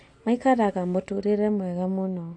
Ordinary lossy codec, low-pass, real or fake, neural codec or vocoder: AAC, 48 kbps; 9.9 kHz; real; none